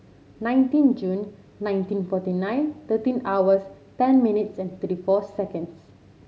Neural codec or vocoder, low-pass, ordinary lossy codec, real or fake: none; none; none; real